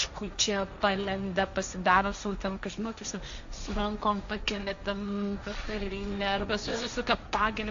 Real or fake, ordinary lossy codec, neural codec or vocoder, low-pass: fake; AAC, 64 kbps; codec, 16 kHz, 1.1 kbps, Voila-Tokenizer; 7.2 kHz